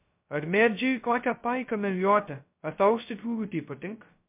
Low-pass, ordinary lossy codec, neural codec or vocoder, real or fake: 3.6 kHz; MP3, 32 kbps; codec, 16 kHz, 0.2 kbps, FocalCodec; fake